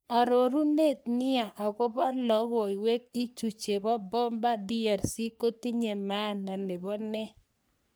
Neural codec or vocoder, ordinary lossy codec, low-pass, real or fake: codec, 44.1 kHz, 3.4 kbps, Pupu-Codec; none; none; fake